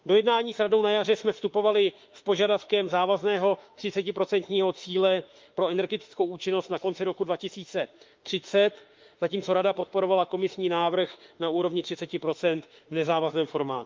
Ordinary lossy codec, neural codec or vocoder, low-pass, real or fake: Opus, 32 kbps; autoencoder, 48 kHz, 32 numbers a frame, DAC-VAE, trained on Japanese speech; 7.2 kHz; fake